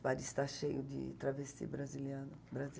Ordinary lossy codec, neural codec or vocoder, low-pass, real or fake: none; none; none; real